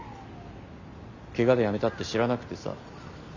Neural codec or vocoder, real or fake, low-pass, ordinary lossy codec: none; real; 7.2 kHz; none